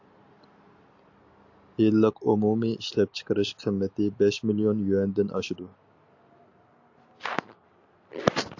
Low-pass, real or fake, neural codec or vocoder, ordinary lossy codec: 7.2 kHz; real; none; AAC, 48 kbps